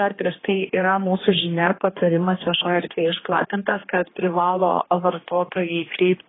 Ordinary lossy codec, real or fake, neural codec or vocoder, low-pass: AAC, 16 kbps; fake; codec, 16 kHz, 2 kbps, X-Codec, HuBERT features, trained on general audio; 7.2 kHz